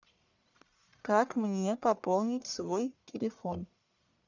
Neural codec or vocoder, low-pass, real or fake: codec, 44.1 kHz, 1.7 kbps, Pupu-Codec; 7.2 kHz; fake